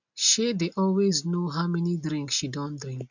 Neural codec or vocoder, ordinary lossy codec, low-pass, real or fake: none; none; 7.2 kHz; real